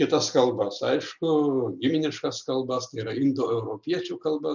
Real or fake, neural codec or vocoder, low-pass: real; none; 7.2 kHz